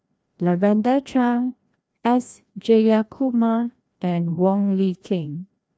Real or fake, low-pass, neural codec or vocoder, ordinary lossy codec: fake; none; codec, 16 kHz, 1 kbps, FreqCodec, larger model; none